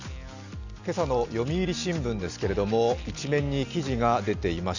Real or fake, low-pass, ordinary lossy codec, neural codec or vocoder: real; 7.2 kHz; none; none